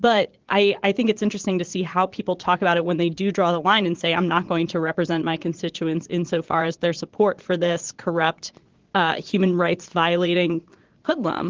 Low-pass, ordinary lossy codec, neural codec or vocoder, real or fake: 7.2 kHz; Opus, 16 kbps; vocoder, 22.05 kHz, 80 mel bands, Vocos; fake